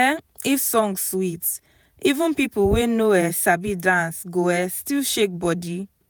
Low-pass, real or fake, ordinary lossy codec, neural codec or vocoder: none; fake; none; vocoder, 48 kHz, 128 mel bands, Vocos